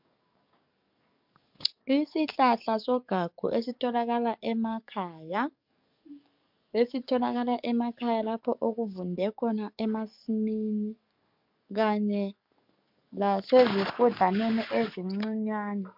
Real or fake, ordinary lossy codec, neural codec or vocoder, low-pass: fake; MP3, 48 kbps; codec, 44.1 kHz, 7.8 kbps, DAC; 5.4 kHz